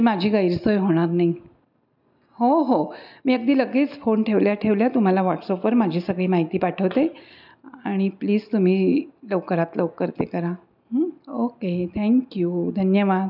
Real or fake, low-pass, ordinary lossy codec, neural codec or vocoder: real; 5.4 kHz; none; none